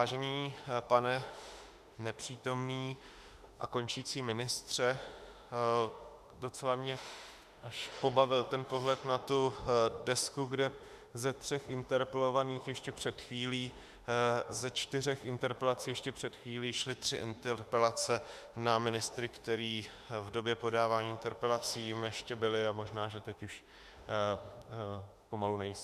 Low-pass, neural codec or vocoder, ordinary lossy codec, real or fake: 14.4 kHz; autoencoder, 48 kHz, 32 numbers a frame, DAC-VAE, trained on Japanese speech; Opus, 64 kbps; fake